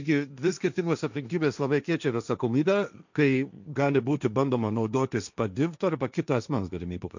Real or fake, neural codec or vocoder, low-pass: fake; codec, 16 kHz, 1.1 kbps, Voila-Tokenizer; 7.2 kHz